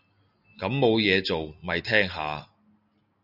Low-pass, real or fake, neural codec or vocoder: 5.4 kHz; real; none